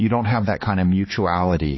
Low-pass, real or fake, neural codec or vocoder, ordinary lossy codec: 7.2 kHz; fake; codec, 16 kHz, 4 kbps, X-Codec, HuBERT features, trained on balanced general audio; MP3, 24 kbps